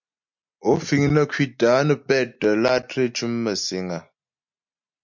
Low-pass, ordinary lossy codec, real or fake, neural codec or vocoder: 7.2 kHz; MP3, 64 kbps; real; none